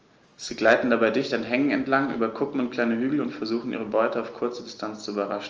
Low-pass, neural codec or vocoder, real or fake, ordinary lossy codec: 7.2 kHz; none; real; Opus, 24 kbps